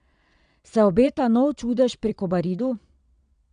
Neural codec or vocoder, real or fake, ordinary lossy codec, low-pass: vocoder, 22.05 kHz, 80 mel bands, Vocos; fake; none; 9.9 kHz